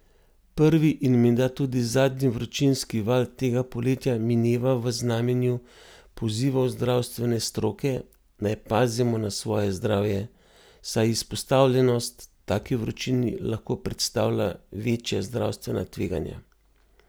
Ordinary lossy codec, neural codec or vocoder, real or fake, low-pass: none; none; real; none